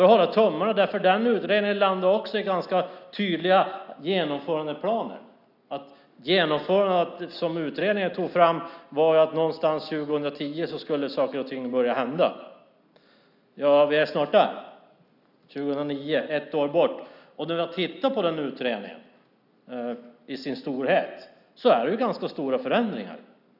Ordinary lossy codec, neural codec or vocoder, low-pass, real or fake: none; none; 5.4 kHz; real